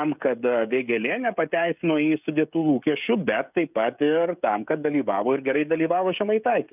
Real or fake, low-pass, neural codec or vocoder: fake; 3.6 kHz; codec, 16 kHz, 16 kbps, FreqCodec, smaller model